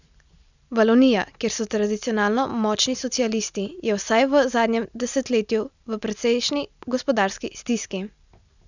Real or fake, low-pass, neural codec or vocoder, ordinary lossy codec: real; 7.2 kHz; none; none